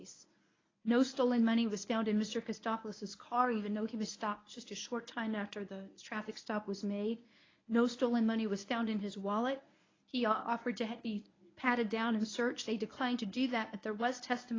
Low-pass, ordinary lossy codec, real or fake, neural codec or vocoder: 7.2 kHz; AAC, 32 kbps; fake; codec, 24 kHz, 0.9 kbps, WavTokenizer, medium speech release version 1